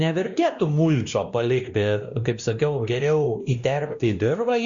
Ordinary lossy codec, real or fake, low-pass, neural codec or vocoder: Opus, 64 kbps; fake; 7.2 kHz; codec, 16 kHz, 1 kbps, X-Codec, WavLM features, trained on Multilingual LibriSpeech